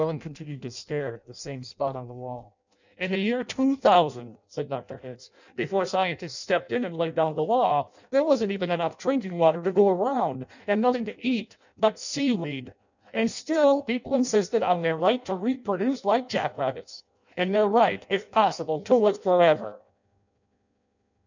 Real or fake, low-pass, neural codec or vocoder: fake; 7.2 kHz; codec, 16 kHz in and 24 kHz out, 0.6 kbps, FireRedTTS-2 codec